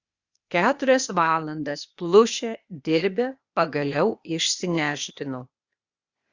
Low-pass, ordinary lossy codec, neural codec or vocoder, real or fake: 7.2 kHz; Opus, 64 kbps; codec, 16 kHz, 0.8 kbps, ZipCodec; fake